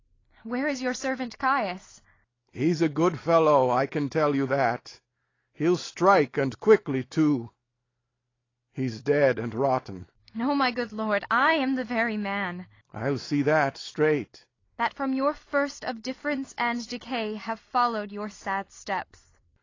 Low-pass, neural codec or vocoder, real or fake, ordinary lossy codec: 7.2 kHz; none; real; AAC, 32 kbps